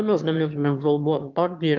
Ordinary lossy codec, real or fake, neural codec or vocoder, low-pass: Opus, 24 kbps; fake; autoencoder, 22.05 kHz, a latent of 192 numbers a frame, VITS, trained on one speaker; 7.2 kHz